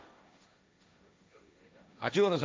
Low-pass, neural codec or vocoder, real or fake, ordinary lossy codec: none; codec, 16 kHz, 1.1 kbps, Voila-Tokenizer; fake; none